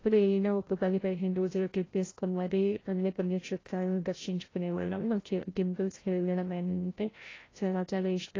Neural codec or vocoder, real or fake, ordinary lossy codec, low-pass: codec, 16 kHz, 0.5 kbps, FreqCodec, larger model; fake; AAC, 32 kbps; 7.2 kHz